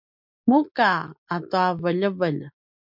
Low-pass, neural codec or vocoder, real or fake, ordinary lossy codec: 5.4 kHz; none; real; MP3, 48 kbps